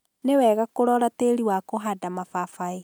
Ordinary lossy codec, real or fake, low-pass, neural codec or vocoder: none; real; none; none